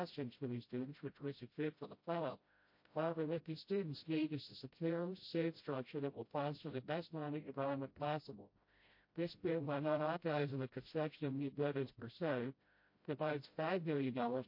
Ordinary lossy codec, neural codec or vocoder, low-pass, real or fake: MP3, 32 kbps; codec, 16 kHz, 0.5 kbps, FreqCodec, smaller model; 5.4 kHz; fake